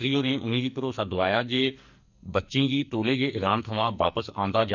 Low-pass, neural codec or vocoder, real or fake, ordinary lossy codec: 7.2 kHz; codec, 44.1 kHz, 2.6 kbps, SNAC; fake; none